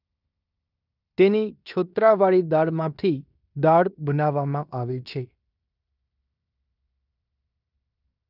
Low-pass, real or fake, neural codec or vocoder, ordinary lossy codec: 5.4 kHz; fake; codec, 16 kHz in and 24 kHz out, 0.9 kbps, LongCat-Audio-Codec, fine tuned four codebook decoder; none